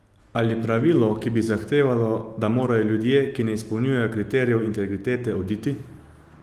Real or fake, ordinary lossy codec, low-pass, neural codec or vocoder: fake; Opus, 24 kbps; 14.4 kHz; autoencoder, 48 kHz, 128 numbers a frame, DAC-VAE, trained on Japanese speech